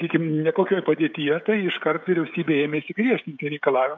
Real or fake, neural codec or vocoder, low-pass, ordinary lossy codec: fake; codec, 16 kHz, 16 kbps, FreqCodec, smaller model; 7.2 kHz; MP3, 64 kbps